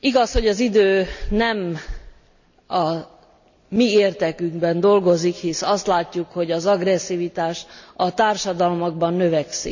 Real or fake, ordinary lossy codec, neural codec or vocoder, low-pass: real; none; none; 7.2 kHz